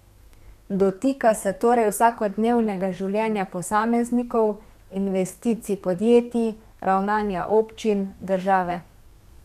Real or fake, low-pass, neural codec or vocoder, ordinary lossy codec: fake; 14.4 kHz; codec, 32 kHz, 1.9 kbps, SNAC; none